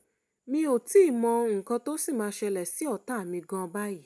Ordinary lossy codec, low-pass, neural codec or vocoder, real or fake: none; none; none; real